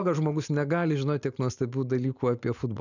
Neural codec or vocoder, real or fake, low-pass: none; real; 7.2 kHz